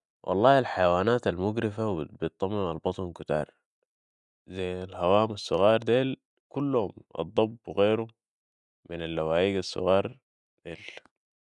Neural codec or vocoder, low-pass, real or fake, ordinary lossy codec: none; 10.8 kHz; real; none